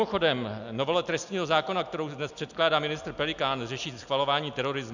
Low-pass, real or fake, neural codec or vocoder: 7.2 kHz; real; none